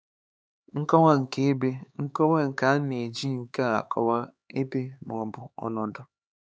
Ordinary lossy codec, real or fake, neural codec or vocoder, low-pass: none; fake; codec, 16 kHz, 4 kbps, X-Codec, HuBERT features, trained on LibriSpeech; none